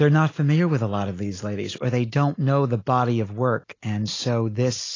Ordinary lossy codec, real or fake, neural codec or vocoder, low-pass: AAC, 32 kbps; real; none; 7.2 kHz